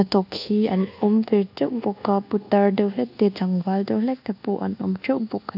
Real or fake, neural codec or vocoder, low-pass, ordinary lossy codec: fake; codec, 24 kHz, 1.2 kbps, DualCodec; 5.4 kHz; none